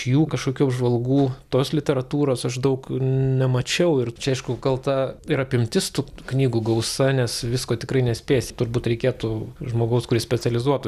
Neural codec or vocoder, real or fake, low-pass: none; real; 14.4 kHz